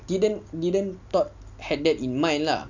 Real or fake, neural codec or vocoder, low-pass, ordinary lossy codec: real; none; 7.2 kHz; none